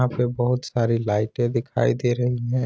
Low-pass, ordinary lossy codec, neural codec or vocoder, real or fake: none; none; none; real